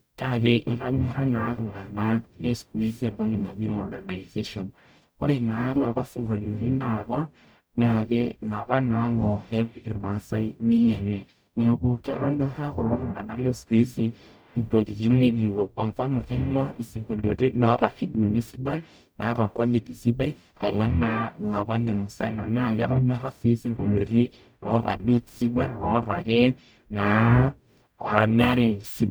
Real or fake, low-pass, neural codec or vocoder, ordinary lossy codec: fake; none; codec, 44.1 kHz, 0.9 kbps, DAC; none